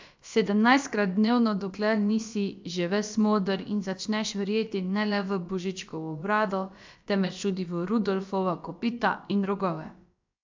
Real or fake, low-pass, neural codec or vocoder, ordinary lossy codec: fake; 7.2 kHz; codec, 16 kHz, about 1 kbps, DyCAST, with the encoder's durations; MP3, 64 kbps